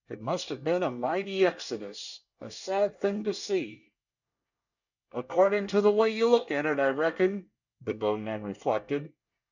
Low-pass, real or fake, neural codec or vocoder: 7.2 kHz; fake; codec, 24 kHz, 1 kbps, SNAC